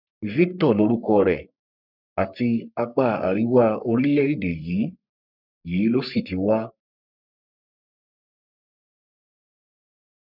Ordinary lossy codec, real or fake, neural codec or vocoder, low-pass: none; fake; codec, 44.1 kHz, 3.4 kbps, Pupu-Codec; 5.4 kHz